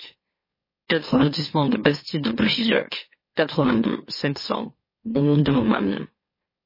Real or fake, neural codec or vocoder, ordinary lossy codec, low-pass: fake; autoencoder, 44.1 kHz, a latent of 192 numbers a frame, MeloTTS; MP3, 24 kbps; 5.4 kHz